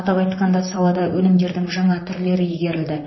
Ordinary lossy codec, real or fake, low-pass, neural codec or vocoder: MP3, 24 kbps; fake; 7.2 kHz; vocoder, 44.1 kHz, 128 mel bands, Pupu-Vocoder